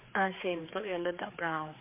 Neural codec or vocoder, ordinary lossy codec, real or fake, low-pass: codec, 16 kHz, 4 kbps, X-Codec, HuBERT features, trained on general audio; MP3, 24 kbps; fake; 3.6 kHz